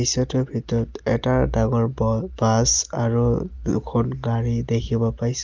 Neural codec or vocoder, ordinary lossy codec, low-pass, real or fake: none; Opus, 24 kbps; 7.2 kHz; real